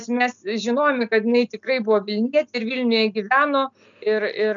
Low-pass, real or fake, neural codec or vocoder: 7.2 kHz; real; none